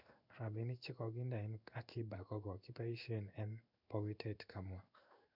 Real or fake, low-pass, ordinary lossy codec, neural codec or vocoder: fake; 5.4 kHz; none; codec, 16 kHz in and 24 kHz out, 1 kbps, XY-Tokenizer